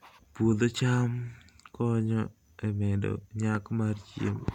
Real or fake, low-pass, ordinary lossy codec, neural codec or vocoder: real; 19.8 kHz; MP3, 96 kbps; none